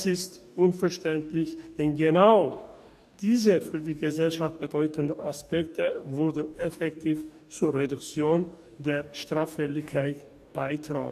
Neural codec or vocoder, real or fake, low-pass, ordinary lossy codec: codec, 44.1 kHz, 2.6 kbps, DAC; fake; 14.4 kHz; none